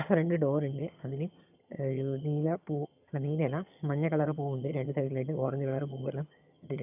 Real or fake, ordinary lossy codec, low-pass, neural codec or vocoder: fake; none; 3.6 kHz; vocoder, 22.05 kHz, 80 mel bands, HiFi-GAN